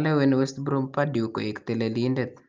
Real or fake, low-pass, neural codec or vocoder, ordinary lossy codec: real; 7.2 kHz; none; Opus, 24 kbps